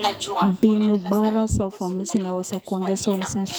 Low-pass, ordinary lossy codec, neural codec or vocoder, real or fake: none; none; codec, 44.1 kHz, 2.6 kbps, SNAC; fake